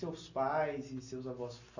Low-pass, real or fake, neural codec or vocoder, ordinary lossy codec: 7.2 kHz; real; none; none